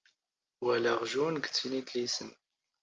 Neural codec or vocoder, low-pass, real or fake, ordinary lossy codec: none; 7.2 kHz; real; Opus, 24 kbps